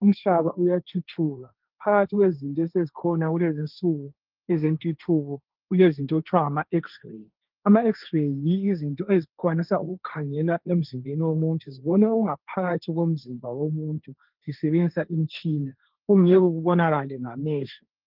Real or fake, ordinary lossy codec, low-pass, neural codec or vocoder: fake; Opus, 24 kbps; 5.4 kHz; codec, 16 kHz, 1.1 kbps, Voila-Tokenizer